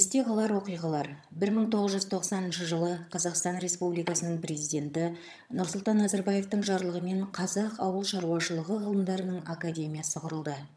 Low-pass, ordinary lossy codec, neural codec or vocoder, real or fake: none; none; vocoder, 22.05 kHz, 80 mel bands, HiFi-GAN; fake